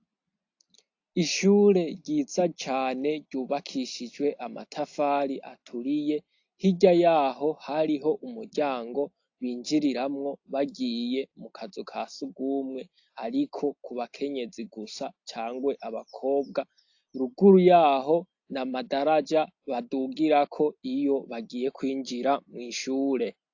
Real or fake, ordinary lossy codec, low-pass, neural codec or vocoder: real; AAC, 48 kbps; 7.2 kHz; none